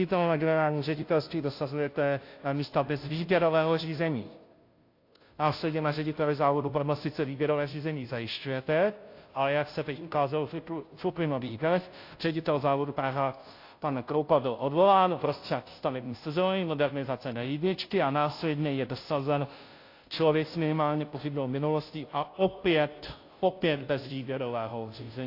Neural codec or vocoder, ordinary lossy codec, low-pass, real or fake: codec, 16 kHz, 0.5 kbps, FunCodec, trained on Chinese and English, 25 frames a second; AAC, 32 kbps; 5.4 kHz; fake